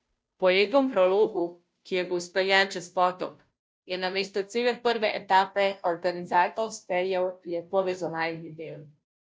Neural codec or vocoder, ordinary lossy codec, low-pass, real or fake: codec, 16 kHz, 0.5 kbps, FunCodec, trained on Chinese and English, 25 frames a second; none; none; fake